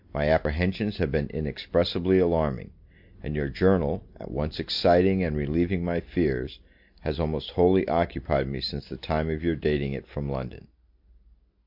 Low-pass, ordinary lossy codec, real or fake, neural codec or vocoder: 5.4 kHz; MP3, 48 kbps; real; none